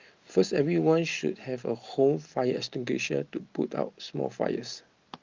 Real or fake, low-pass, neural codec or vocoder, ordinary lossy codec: real; 7.2 kHz; none; Opus, 32 kbps